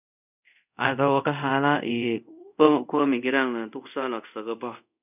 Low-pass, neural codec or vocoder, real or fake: 3.6 kHz; codec, 24 kHz, 0.5 kbps, DualCodec; fake